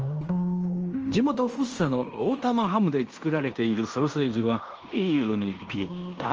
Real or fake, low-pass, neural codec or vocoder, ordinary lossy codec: fake; 7.2 kHz; codec, 16 kHz in and 24 kHz out, 0.9 kbps, LongCat-Audio-Codec, fine tuned four codebook decoder; Opus, 24 kbps